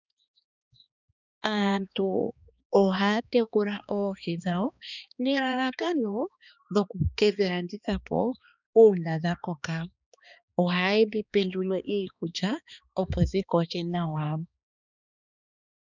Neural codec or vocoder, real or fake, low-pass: codec, 16 kHz, 2 kbps, X-Codec, HuBERT features, trained on balanced general audio; fake; 7.2 kHz